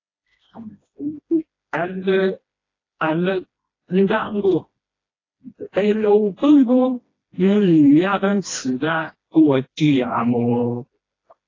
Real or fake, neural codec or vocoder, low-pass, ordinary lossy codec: fake; codec, 16 kHz, 1 kbps, FreqCodec, smaller model; 7.2 kHz; AAC, 32 kbps